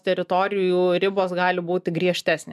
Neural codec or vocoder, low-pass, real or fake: none; 14.4 kHz; real